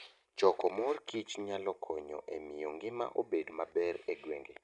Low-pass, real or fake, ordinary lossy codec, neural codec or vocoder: 10.8 kHz; real; none; none